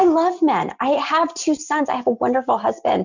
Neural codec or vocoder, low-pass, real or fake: none; 7.2 kHz; real